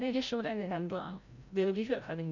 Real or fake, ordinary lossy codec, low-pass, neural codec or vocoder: fake; none; 7.2 kHz; codec, 16 kHz, 0.5 kbps, FreqCodec, larger model